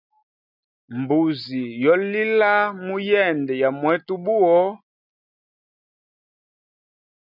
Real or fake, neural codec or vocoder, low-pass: real; none; 5.4 kHz